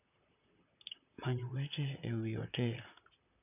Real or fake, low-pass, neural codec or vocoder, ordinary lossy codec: fake; 3.6 kHz; vocoder, 44.1 kHz, 128 mel bands, Pupu-Vocoder; none